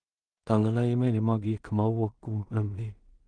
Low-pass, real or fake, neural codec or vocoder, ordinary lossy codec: 9.9 kHz; fake; codec, 16 kHz in and 24 kHz out, 0.4 kbps, LongCat-Audio-Codec, two codebook decoder; Opus, 24 kbps